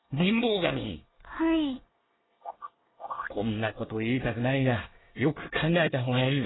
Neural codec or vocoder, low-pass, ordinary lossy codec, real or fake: codec, 24 kHz, 1 kbps, SNAC; 7.2 kHz; AAC, 16 kbps; fake